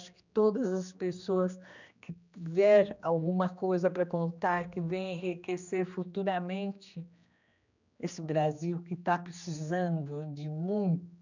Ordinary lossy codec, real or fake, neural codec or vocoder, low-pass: none; fake; codec, 16 kHz, 2 kbps, X-Codec, HuBERT features, trained on general audio; 7.2 kHz